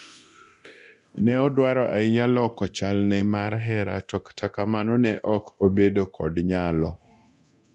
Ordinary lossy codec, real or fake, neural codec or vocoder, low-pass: none; fake; codec, 24 kHz, 0.9 kbps, DualCodec; 10.8 kHz